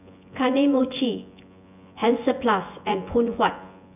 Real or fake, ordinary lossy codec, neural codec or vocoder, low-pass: fake; none; vocoder, 24 kHz, 100 mel bands, Vocos; 3.6 kHz